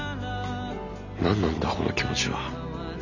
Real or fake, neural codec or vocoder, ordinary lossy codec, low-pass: real; none; none; 7.2 kHz